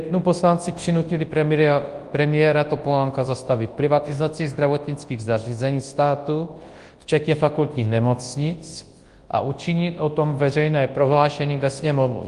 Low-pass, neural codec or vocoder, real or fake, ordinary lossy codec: 10.8 kHz; codec, 24 kHz, 0.9 kbps, WavTokenizer, large speech release; fake; Opus, 24 kbps